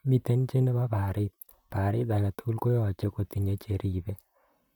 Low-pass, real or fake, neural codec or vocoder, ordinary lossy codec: 19.8 kHz; fake; vocoder, 44.1 kHz, 128 mel bands, Pupu-Vocoder; none